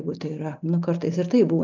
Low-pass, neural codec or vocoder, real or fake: 7.2 kHz; none; real